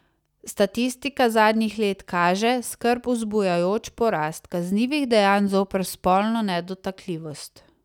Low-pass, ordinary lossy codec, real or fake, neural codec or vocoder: 19.8 kHz; none; real; none